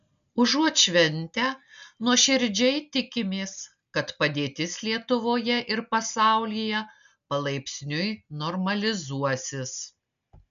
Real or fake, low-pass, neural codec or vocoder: real; 7.2 kHz; none